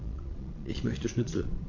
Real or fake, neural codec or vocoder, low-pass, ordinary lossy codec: fake; vocoder, 22.05 kHz, 80 mel bands, Vocos; 7.2 kHz; MP3, 48 kbps